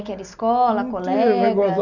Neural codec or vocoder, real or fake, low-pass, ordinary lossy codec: none; real; 7.2 kHz; none